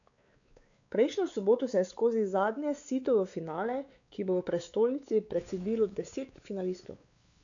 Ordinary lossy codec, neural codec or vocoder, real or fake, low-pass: none; codec, 16 kHz, 4 kbps, X-Codec, WavLM features, trained on Multilingual LibriSpeech; fake; 7.2 kHz